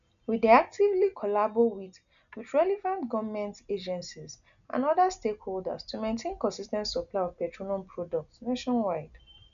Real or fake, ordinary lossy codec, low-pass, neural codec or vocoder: real; none; 7.2 kHz; none